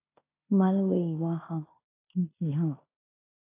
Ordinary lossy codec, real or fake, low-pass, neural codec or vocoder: AAC, 24 kbps; fake; 3.6 kHz; codec, 16 kHz in and 24 kHz out, 0.9 kbps, LongCat-Audio-Codec, fine tuned four codebook decoder